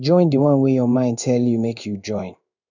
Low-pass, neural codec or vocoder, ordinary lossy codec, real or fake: 7.2 kHz; codec, 16 kHz in and 24 kHz out, 1 kbps, XY-Tokenizer; none; fake